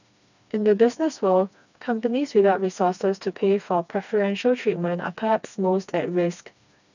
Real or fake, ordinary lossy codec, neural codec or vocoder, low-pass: fake; none; codec, 16 kHz, 2 kbps, FreqCodec, smaller model; 7.2 kHz